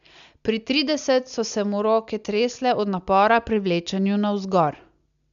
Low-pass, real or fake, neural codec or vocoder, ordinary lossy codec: 7.2 kHz; real; none; none